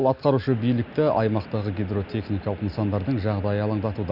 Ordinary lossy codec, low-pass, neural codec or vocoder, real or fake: none; 5.4 kHz; none; real